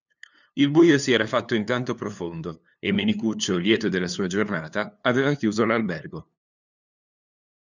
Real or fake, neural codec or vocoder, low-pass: fake; codec, 16 kHz, 2 kbps, FunCodec, trained on LibriTTS, 25 frames a second; 7.2 kHz